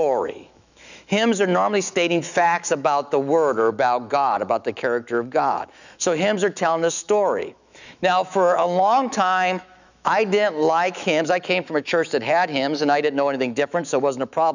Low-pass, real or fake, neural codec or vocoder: 7.2 kHz; fake; autoencoder, 48 kHz, 128 numbers a frame, DAC-VAE, trained on Japanese speech